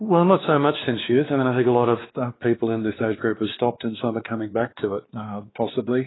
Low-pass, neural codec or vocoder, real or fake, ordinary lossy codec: 7.2 kHz; codec, 16 kHz, 2 kbps, X-Codec, WavLM features, trained on Multilingual LibriSpeech; fake; AAC, 16 kbps